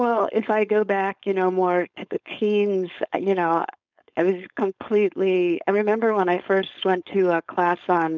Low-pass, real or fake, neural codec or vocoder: 7.2 kHz; fake; codec, 16 kHz, 4.8 kbps, FACodec